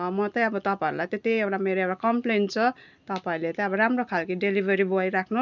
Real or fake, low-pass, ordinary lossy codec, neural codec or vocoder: real; 7.2 kHz; none; none